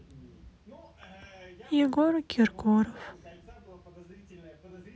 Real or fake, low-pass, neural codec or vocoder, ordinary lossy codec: real; none; none; none